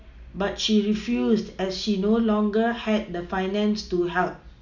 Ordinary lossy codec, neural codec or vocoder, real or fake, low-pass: none; none; real; 7.2 kHz